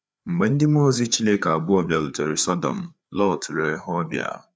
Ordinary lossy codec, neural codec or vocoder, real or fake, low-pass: none; codec, 16 kHz, 4 kbps, FreqCodec, larger model; fake; none